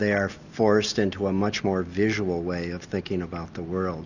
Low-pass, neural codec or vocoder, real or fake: 7.2 kHz; none; real